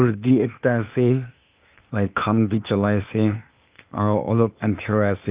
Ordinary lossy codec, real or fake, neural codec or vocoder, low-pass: Opus, 24 kbps; fake; codec, 16 kHz, 0.8 kbps, ZipCodec; 3.6 kHz